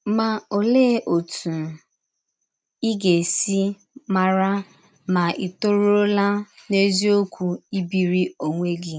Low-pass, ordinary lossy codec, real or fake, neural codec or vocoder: none; none; real; none